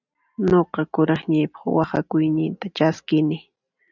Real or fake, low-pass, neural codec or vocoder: real; 7.2 kHz; none